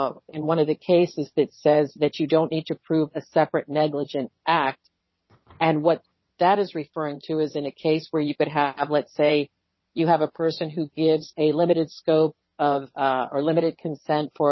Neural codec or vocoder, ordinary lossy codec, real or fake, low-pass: vocoder, 22.05 kHz, 80 mel bands, WaveNeXt; MP3, 24 kbps; fake; 7.2 kHz